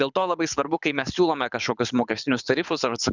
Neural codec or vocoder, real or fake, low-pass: codec, 24 kHz, 6 kbps, HILCodec; fake; 7.2 kHz